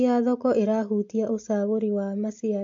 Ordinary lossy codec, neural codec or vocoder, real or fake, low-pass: MP3, 48 kbps; none; real; 7.2 kHz